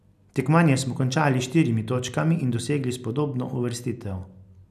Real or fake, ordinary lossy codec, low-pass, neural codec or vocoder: real; none; 14.4 kHz; none